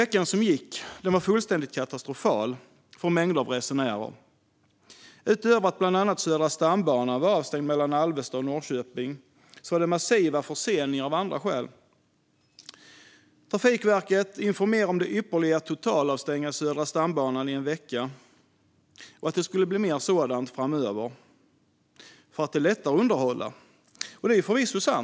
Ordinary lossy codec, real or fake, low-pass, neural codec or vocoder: none; real; none; none